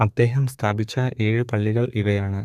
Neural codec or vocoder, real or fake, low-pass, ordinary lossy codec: codec, 32 kHz, 1.9 kbps, SNAC; fake; 14.4 kHz; none